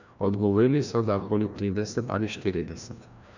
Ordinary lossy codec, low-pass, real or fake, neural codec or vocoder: none; 7.2 kHz; fake; codec, 16 kHz, 1 kbps, FreqCodec, larger model